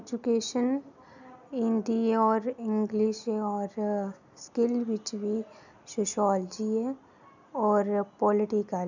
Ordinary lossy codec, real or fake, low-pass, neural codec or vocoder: none; real; 7.2 kHz; none